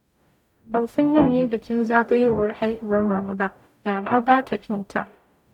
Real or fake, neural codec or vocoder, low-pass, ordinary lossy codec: fake; codec, 44.1 kHz, 0.9 kbps, DAC; 19.8 kHz; none